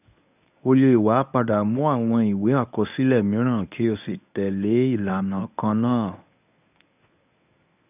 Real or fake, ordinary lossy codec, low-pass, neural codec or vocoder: fake; none; 3.6 kHz; codec, 24 kHz, 0.9 kbps, WavTokenizer, medium speech release version 1